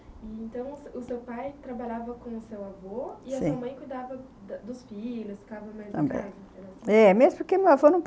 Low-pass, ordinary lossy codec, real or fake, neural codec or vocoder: none; none; real; none